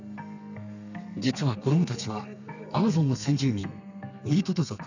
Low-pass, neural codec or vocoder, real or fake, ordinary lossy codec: 7.2 kHz; codec, 32 kHz, 1.9 kbps, SNAC; fake; none